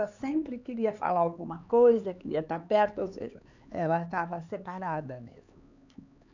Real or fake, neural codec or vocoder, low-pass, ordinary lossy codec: fake; codec, 16 kHz, 4 kbps, X-Codec, HuBERT features, trained on LibriSpeech; 7.2 kHz; none